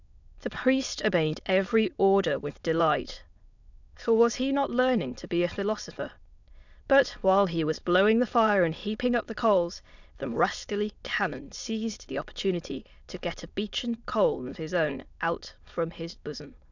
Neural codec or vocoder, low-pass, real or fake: autoencoder, 22.05 kHz, a latent of 192 numbers a frame, VITS, trained on many speakers; 7.2 kHz; fake